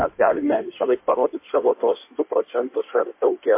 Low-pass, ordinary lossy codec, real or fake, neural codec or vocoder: 3.6 kHz; MP3, 24 kbps; fake; codec, 16 kHz in and 24 kHz out, 1.1 kbps, FireRedTTS-2 codec